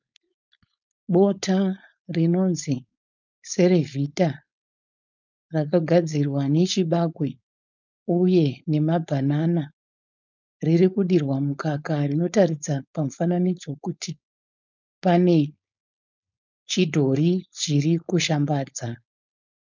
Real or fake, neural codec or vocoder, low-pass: fake; codec, 16 kHz, 4.8 kbps, FACodec; 7.2 kHz